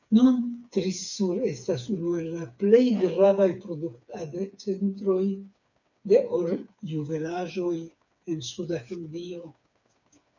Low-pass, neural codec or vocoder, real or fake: 7.2 kHz; codec, 16 kHz, 4 kbps, FreqCodec, smaller model; fake